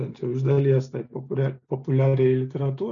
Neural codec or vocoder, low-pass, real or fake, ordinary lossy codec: none; 7.2 kHz; real; MP3, 96 kbps